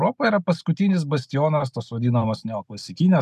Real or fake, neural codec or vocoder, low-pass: real; none; 14.4 kHz